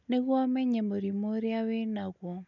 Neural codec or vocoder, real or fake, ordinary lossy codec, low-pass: none; real; none; 7.2 kHz